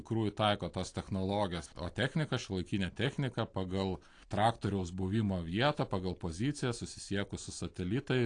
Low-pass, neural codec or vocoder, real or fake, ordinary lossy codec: 9.9 kHz; none; real; AAC, 48 kbps